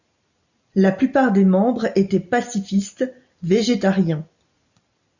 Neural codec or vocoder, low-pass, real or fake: none; 7.2 kHz; real